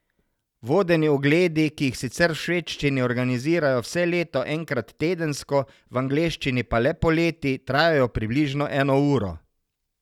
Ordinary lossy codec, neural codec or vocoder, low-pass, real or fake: none; none; 19.8 kHz; real